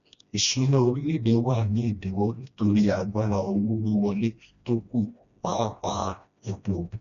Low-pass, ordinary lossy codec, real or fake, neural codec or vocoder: 7.2 kHz; none; fake; codec, 16 kHz, 1 kbps, FreqCodec, smaller model